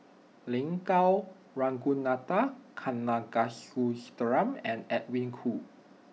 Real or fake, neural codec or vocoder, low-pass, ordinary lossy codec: real; none; none; none